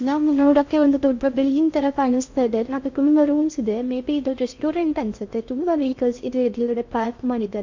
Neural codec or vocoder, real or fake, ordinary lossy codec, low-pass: codec, 16 kHz in and 24 kHz out, 0.6 kbps, FocalCodec, streaming, 2048 codes; fake; MP3, 48 kbps; 7.2 kHz